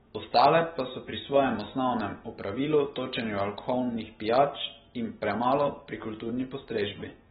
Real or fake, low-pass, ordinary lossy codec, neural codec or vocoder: real; 19.8 kHz; AAC, 16 kbps; none